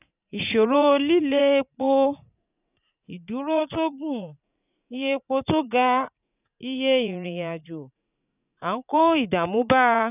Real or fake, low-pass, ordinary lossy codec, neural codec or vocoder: fake; 3.6 kHz; none; vocoder, 44.1 kHz, 80 mel bands, Vocos